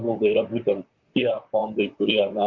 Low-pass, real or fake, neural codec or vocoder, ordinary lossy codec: 7.2 kHz; fake; vocoder, 44.1 kHz, 128 mel bands, Pupu-Vocoder; Opus, 64 kbps